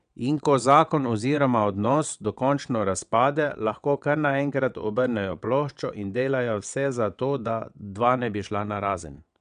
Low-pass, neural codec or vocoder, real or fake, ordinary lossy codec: 9.9 kHz; vocoder, 22.05 kHz, 80 mel bands, WaveNeXt; fake; none